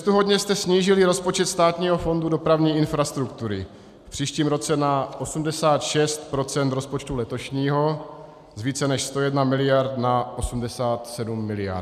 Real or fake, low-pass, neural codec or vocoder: real; 14.4 kHz; none